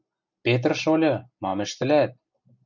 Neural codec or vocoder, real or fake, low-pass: none; real; 7.2 kHz